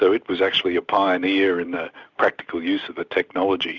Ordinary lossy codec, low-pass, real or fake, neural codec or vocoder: Opus, 64 kbps; 7.2 kHz; real; none